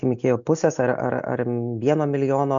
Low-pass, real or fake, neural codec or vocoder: 7.2 kHz; real; none